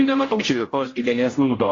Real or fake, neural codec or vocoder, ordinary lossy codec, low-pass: fake; codec, 16 kHz, 0.5 kbps, X-Codec, HuBERT features, trained on general audio; AAC, 32 kbps; 7.2 kHz